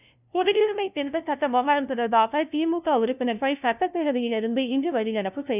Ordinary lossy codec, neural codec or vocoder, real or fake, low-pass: none; codec, 16 kHz, 0.5 kbps, FunCodec, trained on LibriTTS, 25 frames a second; fake; 3.6 kHz